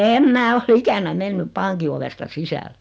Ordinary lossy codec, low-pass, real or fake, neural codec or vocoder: none; none; real; none